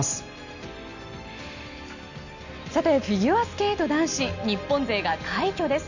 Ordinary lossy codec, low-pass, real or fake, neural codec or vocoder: none; 7.2 kHz; real; none